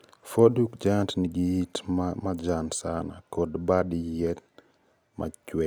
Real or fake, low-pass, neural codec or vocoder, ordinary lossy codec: real; none; none; none